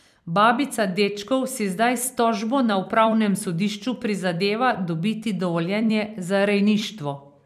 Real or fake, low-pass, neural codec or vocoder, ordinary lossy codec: fake; 14.4 kHz; vocoder, 44.1 kHz, 128 mel bands every 256 samples, BigVGAN v2; none